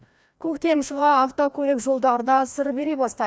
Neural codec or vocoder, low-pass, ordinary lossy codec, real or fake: codec, 16 kHz, 1 kbps, FreqCodec, larger model; none; none; fake